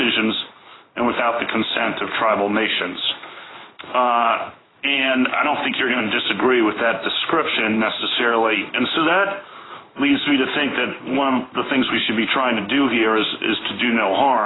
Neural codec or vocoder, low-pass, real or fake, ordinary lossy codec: codec, 16 kHz in and 24 kHz out, 1 kbps, XY-Tokenizer; 7.2 kHz; fake; AAC, 16 kbps